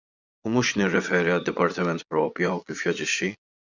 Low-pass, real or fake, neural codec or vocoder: 7.2 kHz; fake; vocoder, 22.05 kHz, 80 mel bands, Vocos